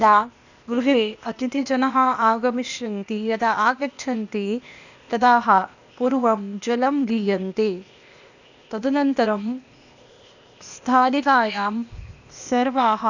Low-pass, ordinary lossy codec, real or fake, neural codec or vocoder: 7.2 kHz; none; fake; codec, 16 kHz, 0.8 kbps, ZipCodec